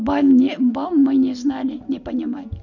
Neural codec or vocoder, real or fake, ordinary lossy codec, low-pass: none; real; none; 7.2 kHz